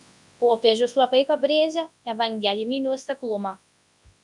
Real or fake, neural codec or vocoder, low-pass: fake; codec, 24 kHz, 0.9 kbps, WavTokenizer, large speech release; 10.8 kHz